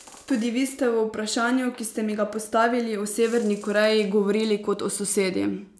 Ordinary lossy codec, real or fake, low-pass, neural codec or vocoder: none; real; none; none